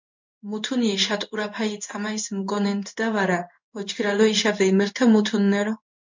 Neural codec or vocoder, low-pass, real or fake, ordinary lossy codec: codec, 16 kHz in and 24 kHz out, 1 kbps, XY-Tokenizer; 7.2 kHz; fake; MP3, 64 kbps